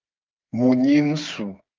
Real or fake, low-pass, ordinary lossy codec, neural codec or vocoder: fake; 7.2 kHz; Opus, 24 kbps; codec, 16 kHz, 4 kbps, FreqCodec, smaller model